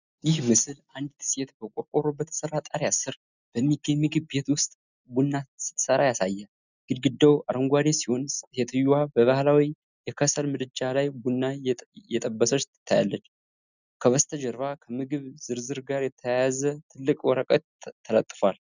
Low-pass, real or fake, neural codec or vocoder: 7.2 kHz; real; none